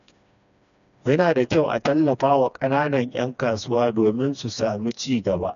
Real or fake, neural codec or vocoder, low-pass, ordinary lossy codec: fake; codec, 16 kHz, 2 kbps, FreqCodec, smaller model; 7.2 kHz; none